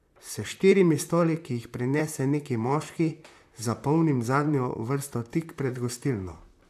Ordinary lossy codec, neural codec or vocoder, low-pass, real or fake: AAC, 96 kbps; vocoder, 44.1 kHz, 128 mel bands, Pupu-Vocoder; 14.4 kHz; fake